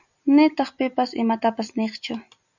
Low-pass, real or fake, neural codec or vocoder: 7.2 kHz; fake; vocoder, 44.1 kHz, 128 mel bands every 256 samples, BigVGAN v2